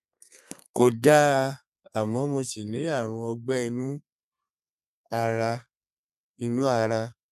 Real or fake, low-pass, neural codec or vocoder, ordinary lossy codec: fake; 14.4 kHz; codec, 32 kHz, 1.9 kbps, SNAC; none